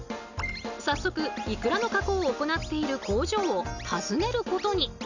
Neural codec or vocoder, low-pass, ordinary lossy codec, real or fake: none; 7.2 kHz; none; real